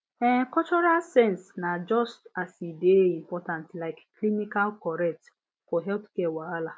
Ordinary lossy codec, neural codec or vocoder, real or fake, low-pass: none; none; real; none